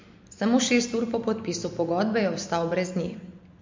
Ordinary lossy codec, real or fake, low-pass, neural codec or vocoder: MP3, 48 kbps; real; 7.2 kHz; none